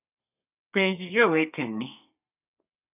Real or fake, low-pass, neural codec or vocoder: fake; 3.6 kHz; codec, 32 kHz, 1.9 kbps, SNAC